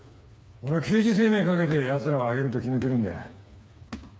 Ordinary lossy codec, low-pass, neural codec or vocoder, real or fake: none; none; codec, 16 kHz, 4 kbps, FreqCodec, smaller model; fake